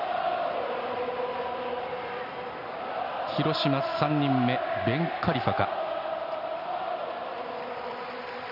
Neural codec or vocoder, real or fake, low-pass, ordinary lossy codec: none; real; 5.4 kHz; none